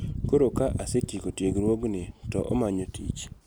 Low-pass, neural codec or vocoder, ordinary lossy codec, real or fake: none; none; none; real